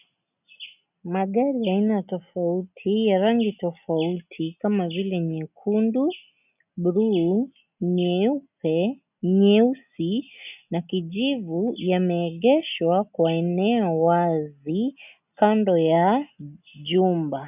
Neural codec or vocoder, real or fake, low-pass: none; real; 3.6 kHz